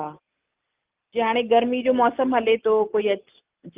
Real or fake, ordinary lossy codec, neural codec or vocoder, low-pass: real; Opus, 32 kbps; none; 3.6 kHz